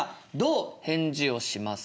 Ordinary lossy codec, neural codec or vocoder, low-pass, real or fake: none; none; none; real